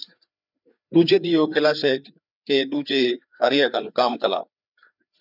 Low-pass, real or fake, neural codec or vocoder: 5.4 kHz; fake; codec, 16 kHz, 4 kbps, FreqCodec, larger model